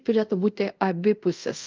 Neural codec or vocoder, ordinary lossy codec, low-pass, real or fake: codec, 24 kHz, 0.5 kbps, DualCodec; Opus, 32 kbps; 7.2 kHz; fake